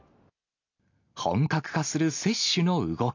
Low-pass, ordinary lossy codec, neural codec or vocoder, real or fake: 7.2 kHz; AAC, 48 kbps; none; real